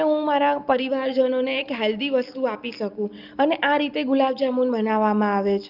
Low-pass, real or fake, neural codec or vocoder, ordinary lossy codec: 5.4 kHz; real; none; Opus, 32 kbps